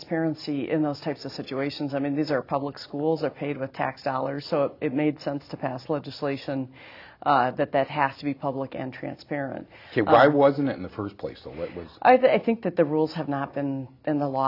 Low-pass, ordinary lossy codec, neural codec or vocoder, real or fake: 5.4 kHz; AAC, 48 kbps; none; real